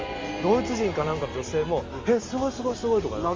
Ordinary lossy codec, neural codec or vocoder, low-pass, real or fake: Opus, 32 kbps; none; 7.2 kHz; real